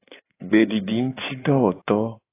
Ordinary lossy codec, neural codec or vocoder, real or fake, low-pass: AAC, 24 kbps; codec, 16 kHz in and 24 kHz out, 2.2 kbps, FireRedTTS-2 codec; fake; 3.6 kHz